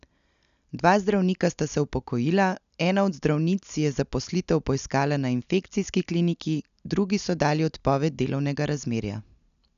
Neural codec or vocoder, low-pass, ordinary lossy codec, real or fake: none; 7.2 kHz; none; real